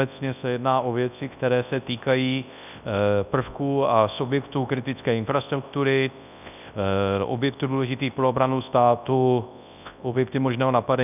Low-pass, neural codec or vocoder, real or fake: 3.6 kHz; codec, 24 kHz, 0.9 kbps, WavTokenizer, large speech release; fake